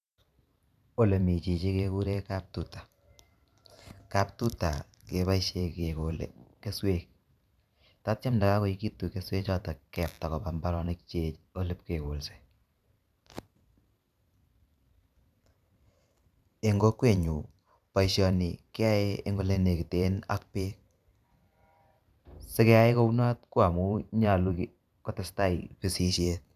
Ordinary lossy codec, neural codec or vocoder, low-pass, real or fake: none; vocoder, 44.1 kHz, 128 mel bands every 512 samples, BigVGAN v2; 14.4 kHz; fake